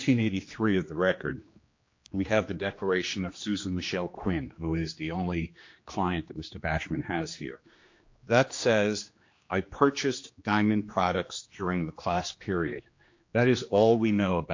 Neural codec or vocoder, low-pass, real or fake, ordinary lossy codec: codec, 16 kHz, 2 kbps, X-Codec, HuBERT features, trained on general audio; 7.2 kHz; fake; MP3, 48 kbps